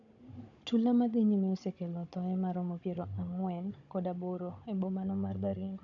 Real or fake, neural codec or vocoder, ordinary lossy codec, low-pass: fake; codec, 16 kHz, 16 kbps, FunCodec, trained on Chinese and English, 50 frames a second; none; 7.2 kHz